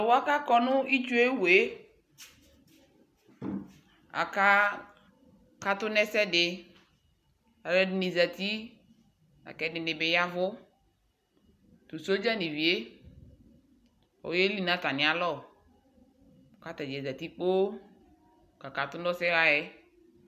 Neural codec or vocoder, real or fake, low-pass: none; real; 14.4 kHz